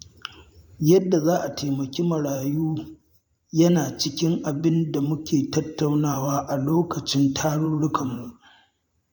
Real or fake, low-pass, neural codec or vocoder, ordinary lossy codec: fake; 19.8 kHz; vocoder, 44.1 kHz, 128 mel bands every 512 samples, BigVGAN v2; MP3, 96 kbps